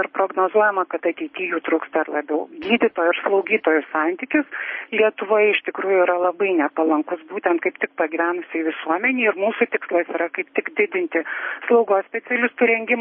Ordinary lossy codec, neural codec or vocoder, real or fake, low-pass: MP3, 24 kbps; none; real; 7.2 kHz